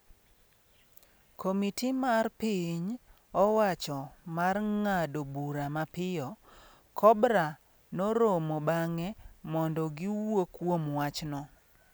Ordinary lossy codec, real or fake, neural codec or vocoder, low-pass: none; real; none; none